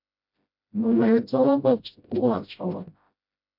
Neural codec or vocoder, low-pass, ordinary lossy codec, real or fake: codec, 16 kHz, 0.5 kbps, FreqCodec, smaller model; 5.4 kHz; MP3, 48 kbps; fake